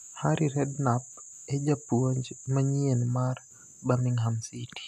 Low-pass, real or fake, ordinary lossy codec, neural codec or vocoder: 14.4 kHz; real; none; none